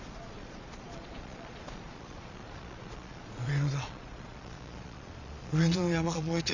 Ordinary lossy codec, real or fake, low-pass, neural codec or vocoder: none; fake; 7.2 kHz; vocoder, 22.05 kHz, 80 mel bands, Vocos